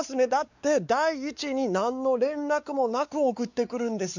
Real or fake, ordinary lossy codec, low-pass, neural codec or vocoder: fake; none; 7.2 kHz; codec, 16 kHz, 4 kbps, X-Codec, WavLM features, trained on Multilingual LibriSpeech